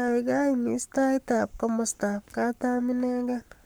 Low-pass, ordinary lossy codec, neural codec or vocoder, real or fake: none; none; codec, 44.1 kHz, 7.8 kbps, Pupu-Codec; fake